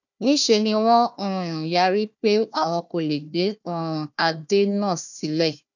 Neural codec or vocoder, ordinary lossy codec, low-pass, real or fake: codec, 16 kHz, 1 kbps, FunCodec, trained on Chinese and English, 50 frames a second; none; 7.2 kHz; fake